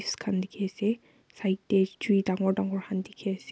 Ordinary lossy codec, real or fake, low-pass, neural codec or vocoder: none; real; none; none